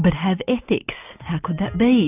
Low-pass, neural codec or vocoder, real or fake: 3.6 kHz; none; real